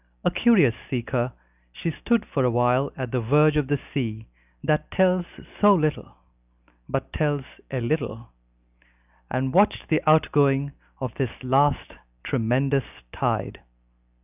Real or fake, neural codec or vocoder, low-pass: real; none; 3.6 kHz